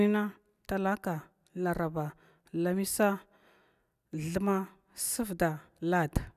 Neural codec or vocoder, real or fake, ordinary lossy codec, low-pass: none; real; none; 14.4 kHz